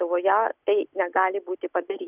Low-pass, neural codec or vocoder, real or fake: 3.6 kHz; none; real